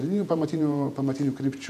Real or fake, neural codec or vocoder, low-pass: fake; vocoder, 48 kHz, 128 mel bands, Vocos; 14.4 kHz